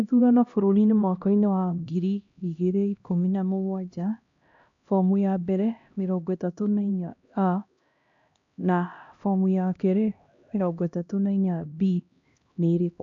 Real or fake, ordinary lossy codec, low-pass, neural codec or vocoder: fake; AAC, 64 kbps; 7.2 kHz; codec, 16 kHz, 1 kbps, X-Codec, HuBERT features, trained on LibriSpeech